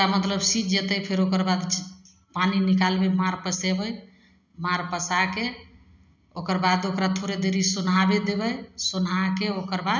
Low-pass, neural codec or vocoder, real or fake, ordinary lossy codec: 7.2 kHz; none; real; none